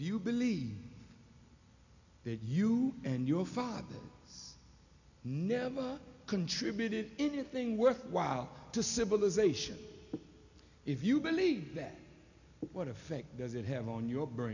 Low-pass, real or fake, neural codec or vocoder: 7.2 kHz; real; none